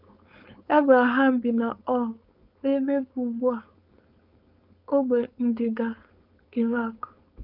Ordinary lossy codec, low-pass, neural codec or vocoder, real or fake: none; 5.4 kHz; codec, 16 kHz, 4.8 kbps, FACodec; fake